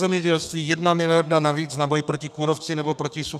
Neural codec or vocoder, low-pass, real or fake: codec, 32 kHz, 1.9 kbps, SNAC; 14.4 kHz; fake